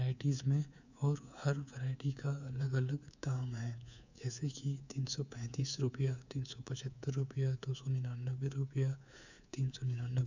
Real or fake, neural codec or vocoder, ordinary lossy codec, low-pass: fake; codec, 24 kHz, 3.1 kbps, DualCodec; none; 7.2 kHz